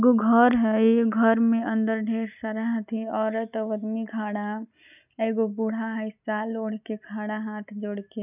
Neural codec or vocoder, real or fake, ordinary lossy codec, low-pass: none; real; none; 3.6 kHz